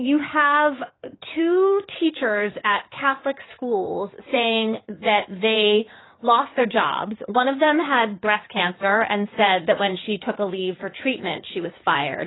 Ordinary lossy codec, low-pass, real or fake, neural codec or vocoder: AAC, 16 kbps; 7.2 kHz; fake; codec, 16 kHz in and 24 kHz out, 2.2 kbps, FireRedTTS-2 codec